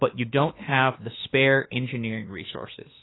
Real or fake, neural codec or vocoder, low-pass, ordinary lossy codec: fake; autoencoder, 48 kHz, 32 numbers a frame, DAC-VAE, trained on Japanese speech; 7.2 kHz; AAC, 16 kbps